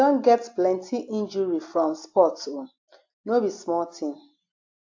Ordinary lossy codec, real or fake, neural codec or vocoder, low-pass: AAC, 48 kbps; real; none; 7.2 kHz